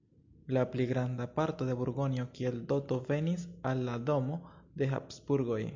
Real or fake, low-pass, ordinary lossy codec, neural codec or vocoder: real; 7.2 kHz; MP3, 48 kbps; none